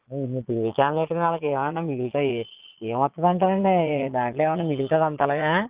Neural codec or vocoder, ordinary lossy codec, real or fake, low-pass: vocoder, 22.05 kHz, 80 mel bands, Vocos; Opus, 32 kbps; fake; 3.6 kHz